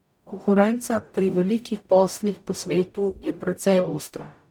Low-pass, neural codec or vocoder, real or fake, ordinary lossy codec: 19.8 kHz; codec, 44.1 kHz, 0.9 kbps, DAC; fake; none